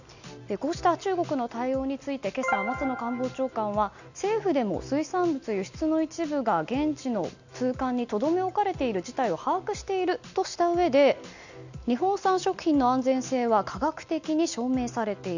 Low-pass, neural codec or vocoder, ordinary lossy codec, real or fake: 7.2 kHz; none; none; real